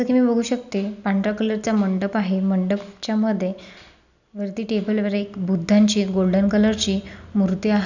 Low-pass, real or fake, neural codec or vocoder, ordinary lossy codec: 7.2 kHz; real; none; none